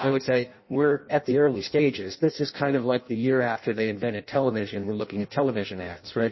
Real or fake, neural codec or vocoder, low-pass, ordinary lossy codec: fake; codec, 16 kHz in and 24 kHz out, 0.6 kbps, FireRedTTS-2 codec; 7.2 kHz; MP3, 24 kbps